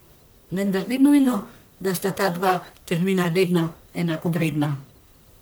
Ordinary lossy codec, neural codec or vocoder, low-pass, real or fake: none; codec, 44.1 kHz, 1.7 kbps, Pupu-Codec; none; fake